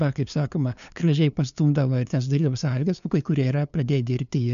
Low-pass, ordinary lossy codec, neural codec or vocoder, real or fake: 7.2 kHz; MP3, 96 kbps; codec, 16 kHz, 2 kbps, FunCodec, trained on LibriTTS, 25 frames a second; fake